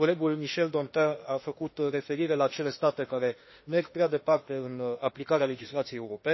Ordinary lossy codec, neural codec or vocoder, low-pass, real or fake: MP3, 24 kbps; autoencoder, 48 kHz, 32 numbers a frame, DAC-VAE, trained on Japanese speech; 7.2 kHz; fake